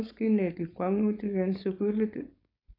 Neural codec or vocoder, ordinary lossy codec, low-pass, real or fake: codec, 16 kHz, 4.8 kbps, FACodec; AAC, 24 kbps; 5.4 kHz; fake